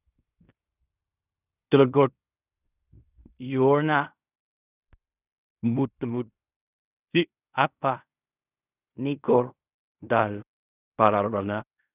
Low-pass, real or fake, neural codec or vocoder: 3.6 kHz; fake; codec, 16 kHz in and 24 kHz out, 0.4 kbps, LongCat-Audio-Codec, fine tuned four codebook decoder